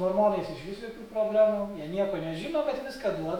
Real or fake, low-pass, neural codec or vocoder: fake; 19.8 kHz; autoencoder, 48 kHz, 128 numbers a frame, DAC-VAE, trained on Japanese speech